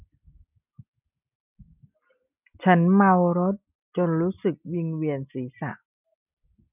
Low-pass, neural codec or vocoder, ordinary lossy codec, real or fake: 3.6 kHz; none; none; real